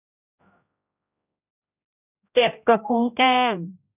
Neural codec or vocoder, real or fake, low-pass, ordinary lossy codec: codec, 16 kHz, 0.5 kbps, X-Codec, HuBERT features, trained on general audio; fake; 3.6 kHz; none